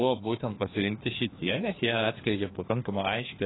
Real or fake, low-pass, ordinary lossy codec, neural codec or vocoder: fake; 7.2 kHz; AAC, 16 kbps; codec, 16 kHz, 2 kbps, FreqCodec, larger model